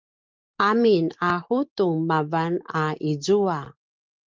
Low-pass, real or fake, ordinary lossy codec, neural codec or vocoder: 7.2 kHz; real; Opus, 32 kbps; none